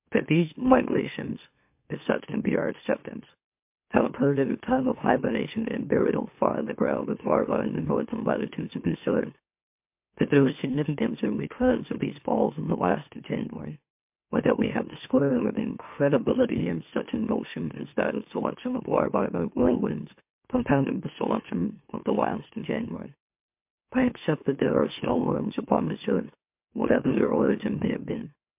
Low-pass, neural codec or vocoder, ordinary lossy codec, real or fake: 3.6 kHz; autoencoder, 44.1 kHz, a latent of 192 numbers a frame, MeloTTS; MP3, 32 kbps; fake